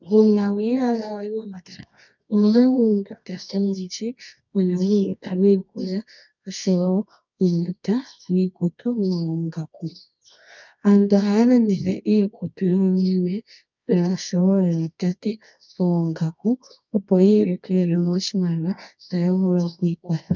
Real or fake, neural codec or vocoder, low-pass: fake; codec, 24 kHz, 0.9 kbps, WavTokenizer, medium music audio release; 7.2 kHz